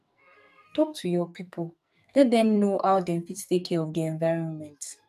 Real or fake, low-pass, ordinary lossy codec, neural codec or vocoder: fake; 14.4 kHz; none; codec, 44.1 kHz, 2.6 kbps, SNAC